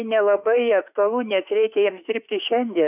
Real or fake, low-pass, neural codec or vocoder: fake; 3.6 kHz; autoencoder, 48 kHz, 32 numbers a frame, DAC-VAE, trained on Japanese speech